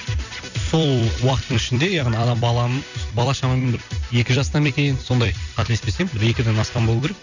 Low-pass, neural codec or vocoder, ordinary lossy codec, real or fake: 7.2 kHz; vocoder, 44.1 kHz, 128 mel bands every 256 samples, BigVGAN v2; none; fake